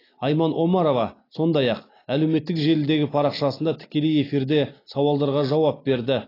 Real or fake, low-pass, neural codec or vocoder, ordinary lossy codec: real; 5.4 kHz; none; AAC, 24 kbps